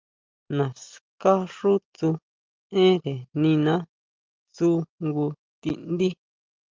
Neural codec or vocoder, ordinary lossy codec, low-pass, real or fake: none; Opus, 32 kbps; 7.2 kHz; real